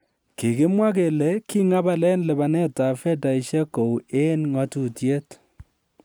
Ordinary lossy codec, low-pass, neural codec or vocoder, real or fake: none; none; none; real